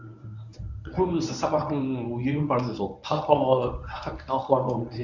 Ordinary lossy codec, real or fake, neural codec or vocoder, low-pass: none; fake; codec, 24 kHz, 0.9 kbps, WavTokenizer, medium speech release version 1; 7.2 kHz